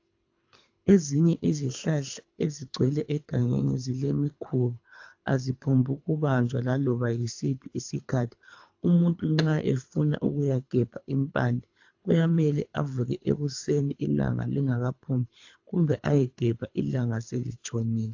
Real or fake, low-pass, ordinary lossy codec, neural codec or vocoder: fake; 7.2 kHz; AAC, 48 kbps; codec, 24 kHz, 3 kbps, HILCodec